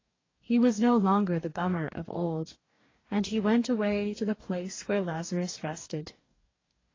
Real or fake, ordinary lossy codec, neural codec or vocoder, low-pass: fake; AAC, 32 kbps; codec, 44.1 kHz, 2.6 kbps, DAC; 7.2 kHz